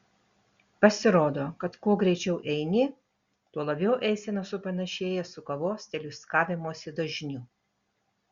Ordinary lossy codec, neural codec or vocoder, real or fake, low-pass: Opus, 64 kbps; none; real; 7.2 kHz